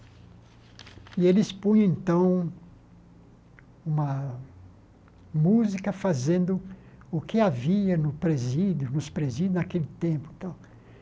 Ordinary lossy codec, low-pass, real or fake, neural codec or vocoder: none; none; real; none